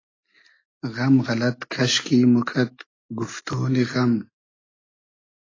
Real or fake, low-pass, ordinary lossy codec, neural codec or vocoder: real; 7.2 kHz; AAC, 32 kbps; none